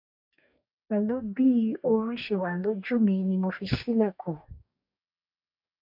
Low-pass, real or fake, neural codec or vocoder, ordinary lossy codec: 5.4 kHz; fake; codec, 44.1 kHz, 2.6 kbps, DAC; MP3, 48 kbps